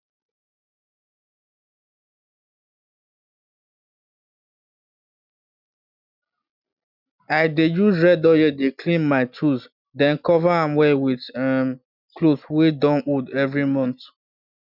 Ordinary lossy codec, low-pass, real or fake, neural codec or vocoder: none; 5.4 kHz; real; none